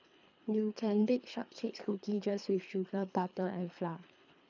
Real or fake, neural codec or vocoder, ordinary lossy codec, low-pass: fake; codec, 24 kHz, 3 kbps, HILCodec; none; 7.2 kHz